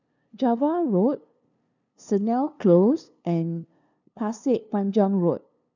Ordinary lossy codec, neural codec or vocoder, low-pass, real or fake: none; codec, 16 kHz, 2 kbps, FunCodec, trained on LibriTTS, 25 frames a second; 7.2 kHz; fake